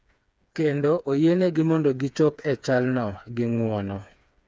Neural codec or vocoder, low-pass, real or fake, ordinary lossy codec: codec, 16 kHz, 4 kbps, FreqCodec, smaller model; none; fake; none